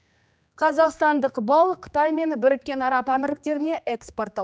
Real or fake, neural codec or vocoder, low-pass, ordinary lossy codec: fake; codec, 16 kHz, 2 kbps, X-Codec, HuBERT features, trained on general audio; none; none